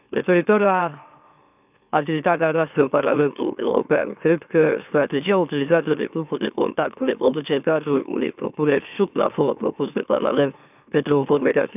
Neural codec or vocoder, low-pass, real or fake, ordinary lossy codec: autoencoder, 44.1 kHz, a latent of 192 numbers a frame, MeloTTS; 3.6 kHz; fake; none